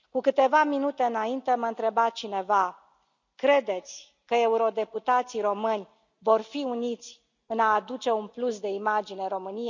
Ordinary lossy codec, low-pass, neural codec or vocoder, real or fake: none; 7.2 kHz; none; real